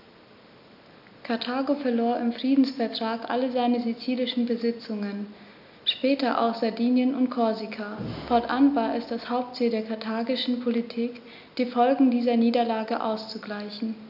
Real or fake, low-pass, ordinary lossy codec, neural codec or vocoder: real; 5.4 kHz; none; none